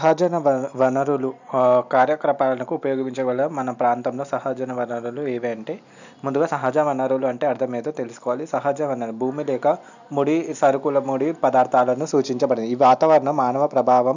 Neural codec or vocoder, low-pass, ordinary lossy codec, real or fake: none; 7.2 kHz; none; real